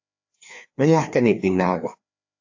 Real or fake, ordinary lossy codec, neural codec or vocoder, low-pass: fake; AAC, 48 kbps; codec, 16 kHz, 2 kbps, FreqCodec, larger model; 7.2 kHz